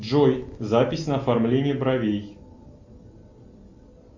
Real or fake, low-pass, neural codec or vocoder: real; 7.2 kHz; none